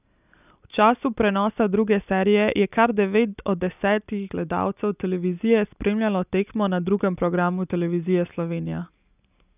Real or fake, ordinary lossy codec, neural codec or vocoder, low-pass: real; none; none; 3.6 kHz